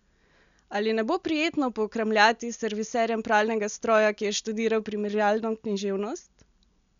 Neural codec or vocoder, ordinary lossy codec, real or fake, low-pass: none; none; real; 7.2 kHz